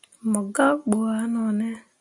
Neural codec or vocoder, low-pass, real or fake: none; 10.8 kHz; real